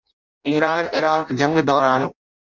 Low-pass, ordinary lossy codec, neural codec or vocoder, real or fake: 7.2 kHz; MP3, 64 kbps; codec, 16 kHz in and 24 kHz out, 0.6 kbps, FireRedTTS-2 codec; fake